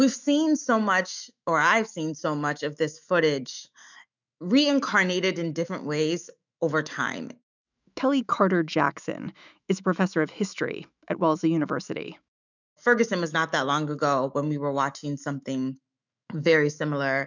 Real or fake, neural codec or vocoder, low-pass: fake; autoencoder, 48 kHz, 128 numbers a frame, DAC-VAE, trained on Japanese speech; 7.2 kHz